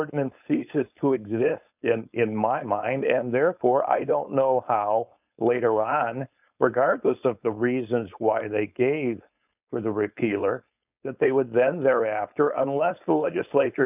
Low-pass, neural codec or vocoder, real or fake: 3.6 kHz; codec, 16 kHz, 4.8 kbps, FACodec; fake